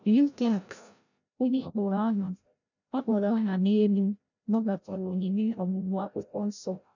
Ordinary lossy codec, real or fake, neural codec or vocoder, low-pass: none; fake; codec, 16 kHz, 0.5 kbps, FreqCodec, larger model; 7.2 kHz